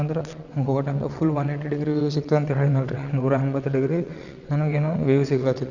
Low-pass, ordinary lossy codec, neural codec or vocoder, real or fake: 7.2 kHz; none; vocoder, 22.05 kHz, 80 mel bands, WaveNeXt; fake